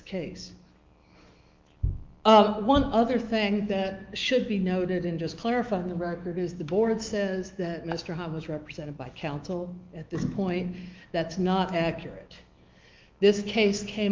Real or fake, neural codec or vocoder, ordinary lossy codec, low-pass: fake; autoencoder, 48 kHz, 128 numbers a frame, DAC-VAE, trained on Japanese speech; Opus, 32 kbps; 7.2 kHz